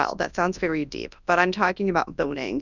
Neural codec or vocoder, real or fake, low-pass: codec, 24 kHz, 0.9 kbps, WavTokenizer, large speech release; fake; 7.2 kHz